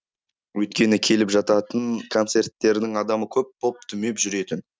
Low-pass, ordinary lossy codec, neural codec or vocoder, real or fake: none; none; none; real